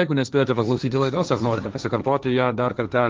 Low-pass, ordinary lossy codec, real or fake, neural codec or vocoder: 7.2 kHz; Opus, 24 kbps; fake; codec, 16 kHz, 1.1 kbps, Voila-Tokenizer